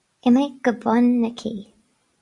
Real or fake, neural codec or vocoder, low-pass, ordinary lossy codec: real; none; 10.8 kHz; Opus, 64 kbps